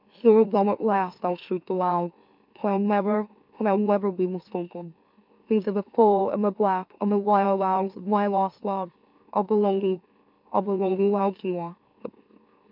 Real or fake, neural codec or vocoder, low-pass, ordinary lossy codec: fake; autoencoder, 44.1 kHz, a latent of 192 numbers a frame, MeloTTS; 5.4 kHz; MP3, 48 kbps